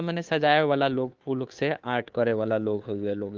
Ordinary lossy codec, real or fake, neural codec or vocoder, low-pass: Opus, 32 kbps; fake; codec, 16 kHz, 2 kbps, FunCodec, trained on Chinese and English, 25 frames a second; 7.2 kHz